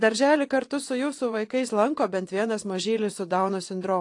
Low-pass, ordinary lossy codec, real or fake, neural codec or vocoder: 10.8 kHz; AAC, 48 kbps; fake; vocoder, 24 kHz, 100 mel bands, Vocos